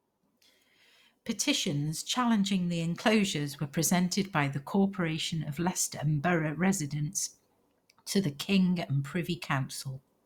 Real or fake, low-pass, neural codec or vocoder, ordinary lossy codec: real; 19.8 kHz; none; Opus, 64 kbps